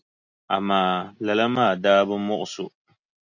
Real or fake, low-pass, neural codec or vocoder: real; 7.2 kHz; none